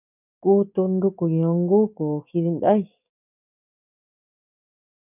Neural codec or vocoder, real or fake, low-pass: codec, 44.1 kHz, 7.8 kbps, DAC; fake; 3.6 kHz